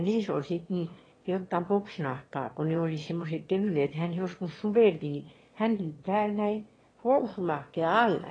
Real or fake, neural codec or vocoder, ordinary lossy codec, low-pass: fake; autoencoder, 22.05 kHz, a latent of 192 numbers a frame, VITS, trained on one speaker; AAC, 32 kbps; 9.9 kHz